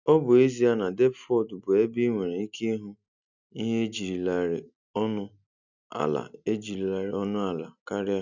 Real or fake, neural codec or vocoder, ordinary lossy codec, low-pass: real; none; none; 7.2 kHz